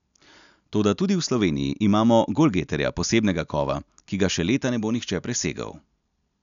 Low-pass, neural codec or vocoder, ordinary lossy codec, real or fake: 7.2 kHz; none; none; real